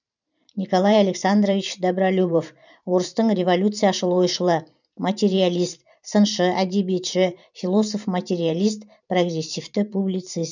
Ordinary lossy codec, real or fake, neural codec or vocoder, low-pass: none; fake; vocoder, 44.1 kHz, 128 mel bands every 512 samples, BigVGAN v2; 7.2 kHz